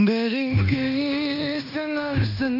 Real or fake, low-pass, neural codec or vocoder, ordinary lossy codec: fake; 5.4 kHz; codec, 16 kHz in and 24 kHz out, 0.9 kbps, LongCat-Audio-Codec, fine tuned four codebook decoder; none